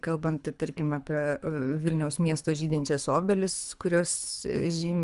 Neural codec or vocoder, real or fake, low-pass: codec, 24 kHz, 3 kbps, HILCodec; fake; 10.8 kHz